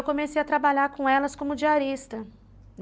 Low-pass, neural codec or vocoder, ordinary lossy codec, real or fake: none; none; none; real